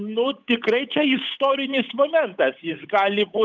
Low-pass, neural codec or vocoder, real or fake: 7.2 kHz; codec, 16 kHz, 8 kbps, FunCodec, trained on Chinese and English, 25 frames a second; fake